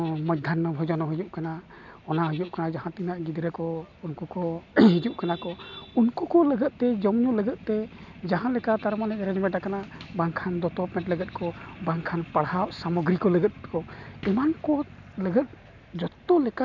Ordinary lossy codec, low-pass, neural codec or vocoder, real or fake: none; 7.2 kHz; none; real